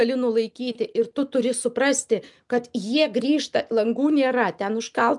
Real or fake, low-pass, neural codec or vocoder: real; 10.8 kHz; none